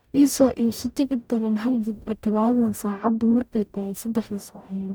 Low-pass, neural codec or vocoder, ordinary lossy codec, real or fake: none; codec, 44.1 kHz, 0.9 kbps, DAC; none; fake